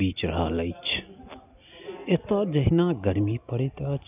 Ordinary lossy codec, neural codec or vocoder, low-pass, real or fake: none; none; 3.6 kHz; real